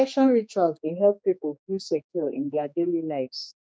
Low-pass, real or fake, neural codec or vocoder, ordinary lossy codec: none; fake; codec, 16 kHz, 2 kbps, X-Codec, HuBERT features, trained on general audio; none